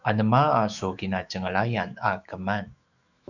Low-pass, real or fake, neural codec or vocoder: 7.2 kHz; fake; autoencoder, 48 kHz, 128 numbers a frame, DAC-VAE, trained on Japanese speech